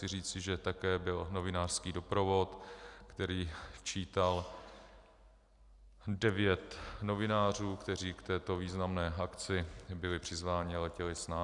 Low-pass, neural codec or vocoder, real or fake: 10.8 kHz; none; real